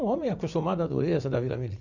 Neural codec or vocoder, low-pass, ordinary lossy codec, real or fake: vocoder, 44.1 kHz, 80 mel bands, Vocos; 7.2 kHz; none; fake